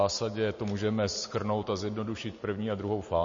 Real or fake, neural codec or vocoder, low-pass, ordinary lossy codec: real; none; 7.2 kHz; MP3, 32 kbps